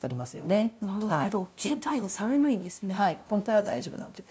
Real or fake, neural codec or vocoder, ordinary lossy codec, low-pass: fake; codec, 16 kHz, 0.5 kbps, FunCodec, trained on LibriTTS, 25 frames a second; none; none